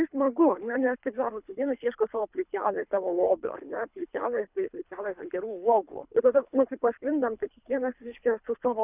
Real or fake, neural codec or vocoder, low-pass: fake; codec, 24 kHz, 3 kbps, HILCodec; 3.6 kHz